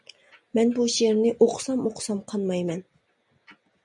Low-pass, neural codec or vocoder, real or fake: 10.8 kHz; none; real